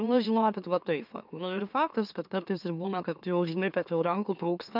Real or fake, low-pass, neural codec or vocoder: fake; 5.4 kHz; autoencoder, 44.1 kHz, a latent of 192 numbers a frame, MeloTTS